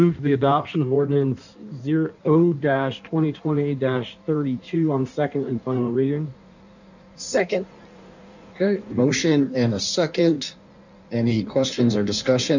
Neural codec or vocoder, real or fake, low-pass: codec, 16 kHz in and 24 kHz out, 1.1 kbps, FireRedTTS-2 codec; fake; 7.2 kHz